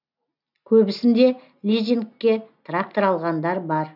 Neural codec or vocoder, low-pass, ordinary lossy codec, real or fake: none; 5.4 kHz; none; real